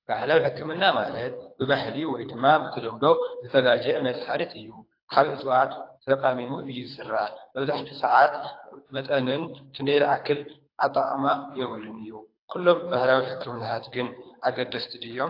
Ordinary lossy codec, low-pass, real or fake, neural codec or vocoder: AAC, 32 kbps; 5.4 kHz; fake; codec, 24 kHz, 3 kbps, HILCodec